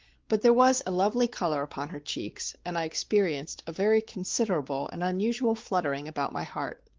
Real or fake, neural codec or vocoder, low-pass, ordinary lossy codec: fake; codec, 16 kHz, 4 kbps, X-Codec, WavLM features, trained on Multilingual LibriSpeech; 7.2 kHz; Opus, 16 kbps